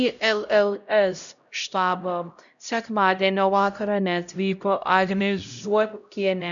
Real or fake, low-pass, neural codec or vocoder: fake; 7.2 kHz; codec, 16 kHz, 0.5 kbps, X-Codec, HuBERT features, trained on LibriSpeech